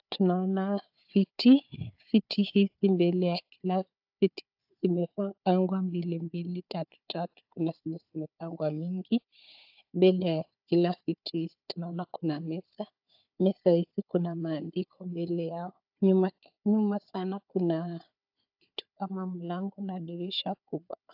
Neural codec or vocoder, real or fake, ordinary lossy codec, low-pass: codec, 16 kHz, 4 kbps, FunCodec, trained on Chinese and English, 50 frames a second; fake; AAC, 48 kbps; 5.4 kHz